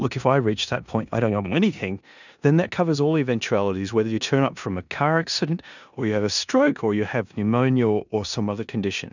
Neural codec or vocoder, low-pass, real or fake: codec, 16 kHz in and 24 kHz out, 0.9 kbps, LongCat-Audio-Codec, four codebook decoder; 7.2 kHz; fake